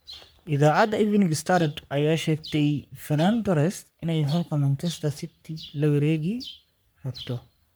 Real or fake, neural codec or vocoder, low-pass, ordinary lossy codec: fake; codec, 44.1 kHz, 3.4 kbps, Pupu-Codec; none; none